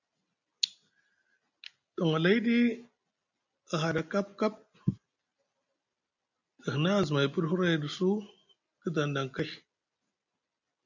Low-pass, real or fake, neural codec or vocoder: 7.2 kHz; real; none